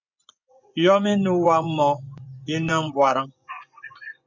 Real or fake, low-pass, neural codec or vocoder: fake; 7.2 kHz; vocoder, 24 kHz, 100 mel bands, Vocos